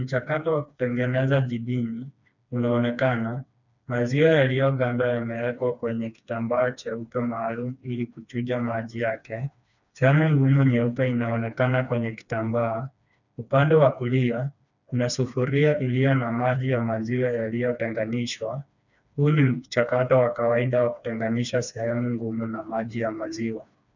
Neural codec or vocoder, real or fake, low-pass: codec, 16 kHz, 2 kbps, FreqCodec, smaller model; fake; 7.2 kHz